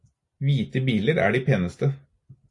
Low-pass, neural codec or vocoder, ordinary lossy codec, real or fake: 10.8 kHz; none; AAC, 48 kbps; real